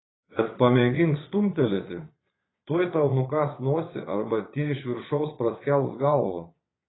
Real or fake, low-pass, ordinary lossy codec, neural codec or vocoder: fake; 7.2 kHz; AAC, 16 kbps; vocoder, 22.05 kHz, 80 mel bands, Vocos